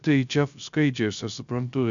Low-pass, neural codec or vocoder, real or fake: 7.2 kHz; codec, 16 kHz, 0.3 kbps, FocalCodec; fake